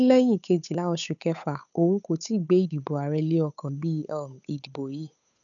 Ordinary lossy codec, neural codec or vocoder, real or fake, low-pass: none; codec, 16 kHz, 8 kbps, FunCodec, trained on Chinese and English, 25 frames a second; fake; 7.2 kHz